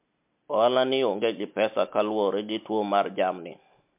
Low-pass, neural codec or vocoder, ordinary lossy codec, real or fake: 3.6 kHz; none; MP3, 32 kbps; real